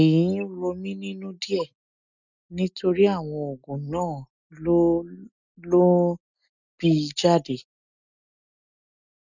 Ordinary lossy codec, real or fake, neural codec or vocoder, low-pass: none; real; none; 7.2 kHz